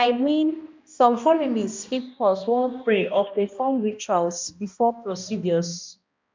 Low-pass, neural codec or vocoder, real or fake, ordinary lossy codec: 7.2 kHz; codec, 16 kHz, 1 kbps, X-Codec, HuBERT features, trained on balanced general audio; fake; MP3, 64 kbps